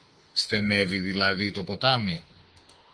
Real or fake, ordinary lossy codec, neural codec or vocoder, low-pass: fake; Opus, 24 kbps; autoencoder, 48 kHz, 32 numbers a frame, DAC-VAE, trained on Japanese speech; 9.9 kHz